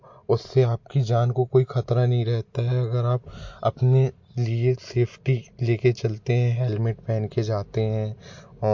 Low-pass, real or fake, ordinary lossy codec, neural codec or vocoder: 7.2 kHz; real; MP3, 48 kbps; none